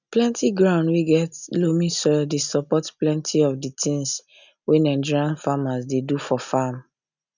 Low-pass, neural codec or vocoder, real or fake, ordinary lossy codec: 7.2 kHz; none; real; none